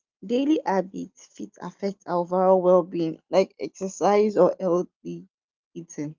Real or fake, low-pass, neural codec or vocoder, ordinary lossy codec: real; 7.2 kHz; none; Opus, 32 kbps